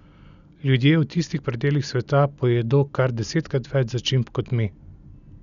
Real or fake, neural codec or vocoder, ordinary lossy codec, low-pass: real; none; none; 7.2 kHz